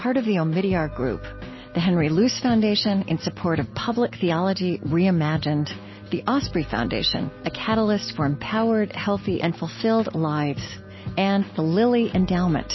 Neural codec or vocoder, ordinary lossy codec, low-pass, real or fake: none; MP3, 24 kbps; 7.2 kHz; real